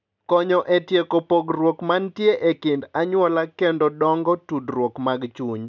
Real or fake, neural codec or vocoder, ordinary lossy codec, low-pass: real; none; none; 7.2 kHz